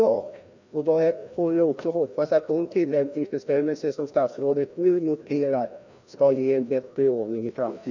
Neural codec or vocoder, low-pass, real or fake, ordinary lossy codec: codec, 16 kHz, 1 kbps, FreqCodec, larger model; 7.2 kHz; fake; none